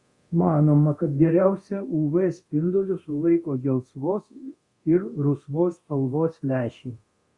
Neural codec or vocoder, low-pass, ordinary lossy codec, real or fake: codec, 24 kHz, 0.9 kbps, DualCodec; 10.8 kHz; Opus, 64 kbps; fake